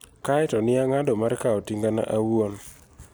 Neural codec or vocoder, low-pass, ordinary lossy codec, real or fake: none; none; none; real